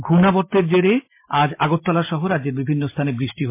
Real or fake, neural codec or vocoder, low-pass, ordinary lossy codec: real; none; 3.6 kHz; MP3, 24 kbps